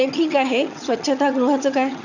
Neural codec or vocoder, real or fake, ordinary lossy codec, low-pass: vocoder, 22.05 kHz, 80 mel bands, HiFi-GAN; fake; none; 7.2 kHz